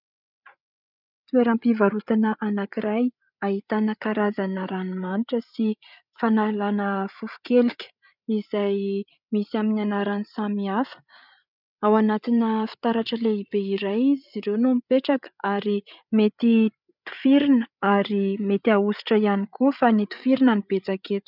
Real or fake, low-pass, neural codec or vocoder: fake; 5.4 kHz; codec, 16 kHz, 8 kbps, FreqCodec, larger model